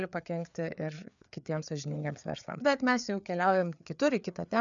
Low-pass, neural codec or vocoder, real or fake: 7.2 kHz; codec, 16 kHz, 4 kbps, FreqCodec, larger model; fake